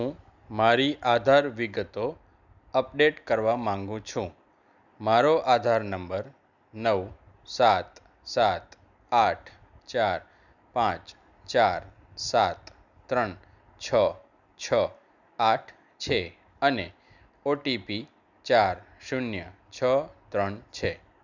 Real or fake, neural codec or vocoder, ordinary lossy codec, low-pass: real; none; none; 7.2 kHz